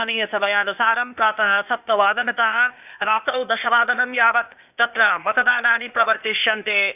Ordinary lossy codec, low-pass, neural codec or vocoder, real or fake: none; 3.6 kHz; codec, 16 kHz, 0.8 kbps, ZipCodec; fake